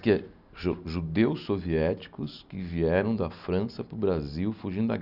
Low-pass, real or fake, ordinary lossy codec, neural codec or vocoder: 5.4 kHz; real; none; none